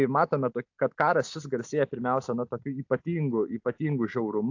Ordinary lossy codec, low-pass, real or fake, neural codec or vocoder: AAC, 48 kbps; 7.2 kHz; real; none